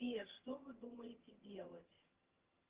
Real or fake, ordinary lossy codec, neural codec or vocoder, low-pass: fake; Opus, 16 kbps; vocoder, 22.05 kHz, 80 mel bands, HiFi-GAN; 3.6 kHz